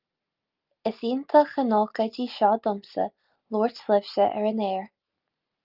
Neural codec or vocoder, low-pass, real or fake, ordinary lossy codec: none; 5.4 kHz; real; Opus, 24 kbps